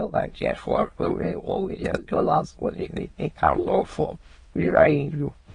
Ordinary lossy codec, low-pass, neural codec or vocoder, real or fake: AAC, 32 kbps; 9.9 kHz; autoencoder, 22.05 kHz, a latent of 192 numbers a frame, VITS, trained on many speakers; fake